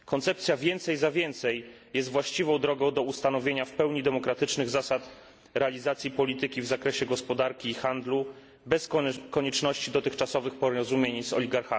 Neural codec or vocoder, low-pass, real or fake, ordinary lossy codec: none; none; real; none